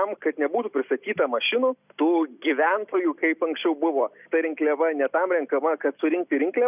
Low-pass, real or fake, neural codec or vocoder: 3.6 kHz; real; none